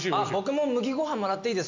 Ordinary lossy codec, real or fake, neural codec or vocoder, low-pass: MP3, 64 kbps; real; none; 7.2 kHz